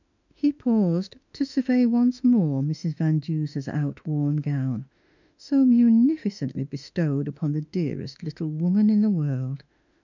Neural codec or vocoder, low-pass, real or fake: autoencoder, 48 kHz, 32 numbers a frame, DAC-VAE, trained on Japanese speech; 7.2 kHz; fake